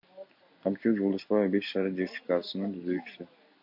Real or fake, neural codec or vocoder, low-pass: real; none; 5.4 kHz